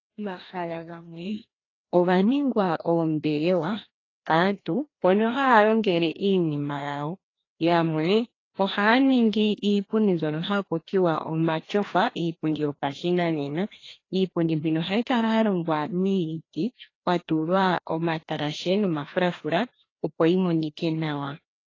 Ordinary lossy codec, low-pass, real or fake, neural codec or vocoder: AAC, 32 kbps; 7.2 kHz; fake; codec, 16 kHz, 1 kbps, FreqCodec, larger model